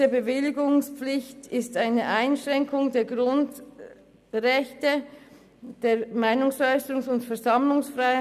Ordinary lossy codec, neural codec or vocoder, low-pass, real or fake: none; none; 14.4 kHz; real